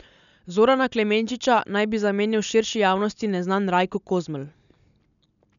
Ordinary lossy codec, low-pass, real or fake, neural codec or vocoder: none; 7.2 kHz; real; none